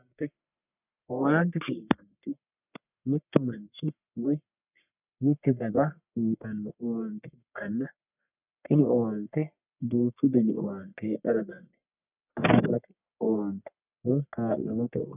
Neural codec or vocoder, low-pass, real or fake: codec, 44.1 kHz, 1.7 kbps, Pupu-Codec; 3.6 kHz; fake